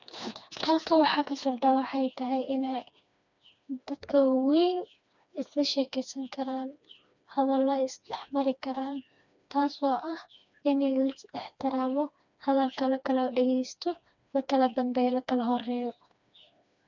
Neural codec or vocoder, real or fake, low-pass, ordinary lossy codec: codec, 16 kHz, 2 kbps, FreqCodec, smaller model; fake; 7.2 kHz; none